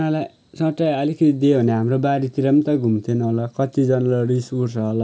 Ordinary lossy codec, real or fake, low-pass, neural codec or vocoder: none; real; none; none